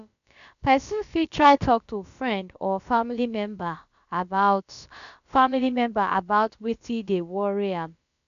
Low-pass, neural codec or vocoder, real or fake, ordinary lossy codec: 7.2 kHz; codec, 16 kHz, about 1 kbps, DyCAST, with the encoder's durations; fake; none